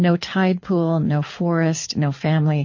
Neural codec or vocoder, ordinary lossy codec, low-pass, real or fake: codec, 24 kHz, 6 kbps, HILCodec; MP3, 32 kbps; 7.2 kHz; fake